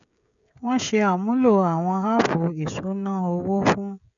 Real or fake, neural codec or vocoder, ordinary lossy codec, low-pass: fake; codec, 16 kHz, 16 kbps, FreqCodec, smaller model; none; 7.2 kHz